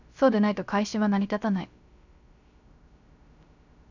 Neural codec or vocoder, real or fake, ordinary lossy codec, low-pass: codec, 16 kHz, 0.3 kbps, FocalCodec; fake; none; 7.2 kHz